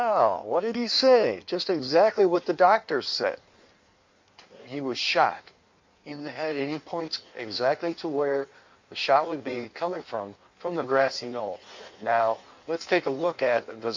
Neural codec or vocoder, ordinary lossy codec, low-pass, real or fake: codec, 16 kHz in and 24 kHz out, 1.1 kbps, FireRedTTS-2 codec; MP3, 48 kbps; 7.2 kHz; fake